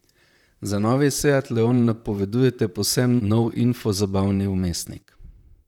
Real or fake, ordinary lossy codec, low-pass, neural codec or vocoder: fake; none; 19.8 kHz; vocoder, 44.1 kHz, 128 mel bands, Pupu-Vocoder